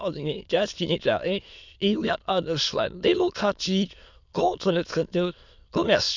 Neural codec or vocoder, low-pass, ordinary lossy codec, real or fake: autoencoder, 22.05 kHz, a latent of 192 numbers a frame, VITS, trained on many speakers; 7.2 kHz; none; fake